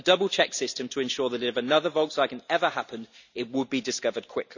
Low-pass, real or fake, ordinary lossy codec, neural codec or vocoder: 7.2 kHz; real; none; none